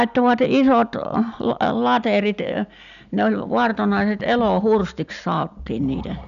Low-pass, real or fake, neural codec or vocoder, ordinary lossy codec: 7.2 kHz; real; none; none